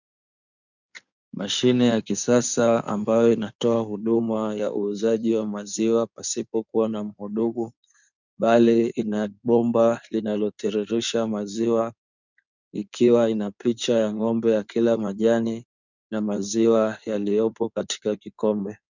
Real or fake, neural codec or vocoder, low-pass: fake; codec, 16 kHz in and 24 kHz out, 2.2 kbps, FireRedTTS-2 codec; 7.2 kHz